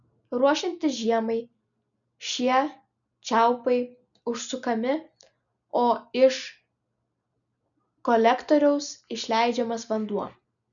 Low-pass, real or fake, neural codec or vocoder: 7.2 kHz; real; none